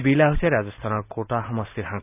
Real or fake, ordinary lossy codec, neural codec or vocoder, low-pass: real; none; none; 3.6 kHz